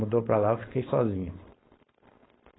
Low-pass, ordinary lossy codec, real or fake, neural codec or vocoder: 7.2 kHz; AAC, 16 kbps; fake; codec, 16 kHz, 4.8 kbps, FACodec